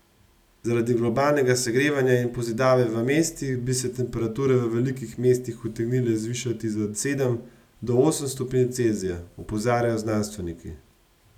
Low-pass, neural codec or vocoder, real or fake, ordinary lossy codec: 19.8 kHz; none; real; none